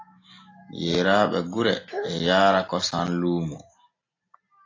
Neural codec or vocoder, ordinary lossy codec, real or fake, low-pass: none; AAC, 32 kbps; real; 7.2 kHz